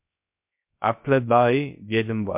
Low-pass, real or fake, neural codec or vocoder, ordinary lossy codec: 3.6 kHz; fake; codec, 16 kHz, 0.3 kbps, FocalCodec; MP3, 32 kbps